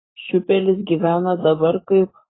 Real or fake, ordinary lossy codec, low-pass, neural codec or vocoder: fake; AAC, 16 kbps; 7.2 kHz; codec, 44.1 kHz, 7.8 kbps, Pupu-Codec